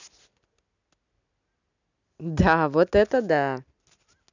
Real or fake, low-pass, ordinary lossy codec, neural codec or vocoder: real; 7.2 kHz; none; none